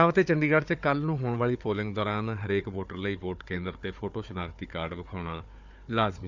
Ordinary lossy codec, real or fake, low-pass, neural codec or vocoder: none; fake; 7.2 kHz; codec, 16 kHz, 4 kbps, FunCodec, trained on Chinese and English, 50 frames a second